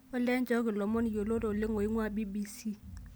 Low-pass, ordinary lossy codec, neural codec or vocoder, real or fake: none; none; none; real